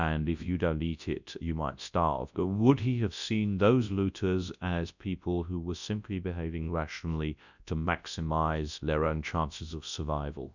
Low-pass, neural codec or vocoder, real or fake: 7.2 kHz; codec, 24 kHz, 0.9 kbps, WavTokenizer, large speech release; fake